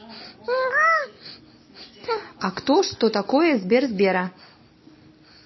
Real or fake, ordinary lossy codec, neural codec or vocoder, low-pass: real; MP3, 24 kbps; none; 7.2 kHz